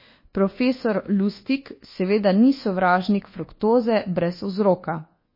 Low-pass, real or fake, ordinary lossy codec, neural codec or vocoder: 5.4 kHz; fake; MP3, 24 kbps; codec, 24 kHz, 1.2 kbps, DualCodec